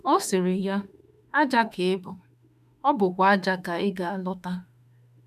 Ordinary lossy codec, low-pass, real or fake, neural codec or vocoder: none; 14.4 kHz; fake; autoencoder, 48 kHz, 32 numbers a frame, DAC-VAE, trained on Japanese speech